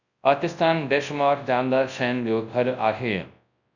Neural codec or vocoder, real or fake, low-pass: codec, 24 kHz, 0.9 kbps, WavTokenizer, large speech release; fake; 7.2 kHz